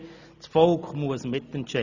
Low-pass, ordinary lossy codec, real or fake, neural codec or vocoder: 7.2 kHz; none; real; none